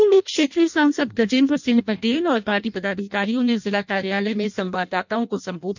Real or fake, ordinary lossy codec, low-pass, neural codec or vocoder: fake; none; 7.2 kHz; codec, 16 kHz in and 24 kHz out, 0.6 kbps, FireRedTTS-2 codec